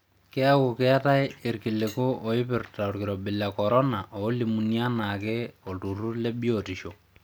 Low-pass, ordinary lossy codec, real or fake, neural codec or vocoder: none; none; real; none